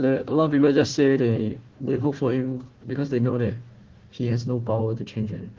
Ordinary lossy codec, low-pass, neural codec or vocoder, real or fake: Opus, 16 kbps; 7.2 kHz; codec, 16 kHz, 1 kbps, FunCodec, trained on Chinese and English, 50 frames a second; fake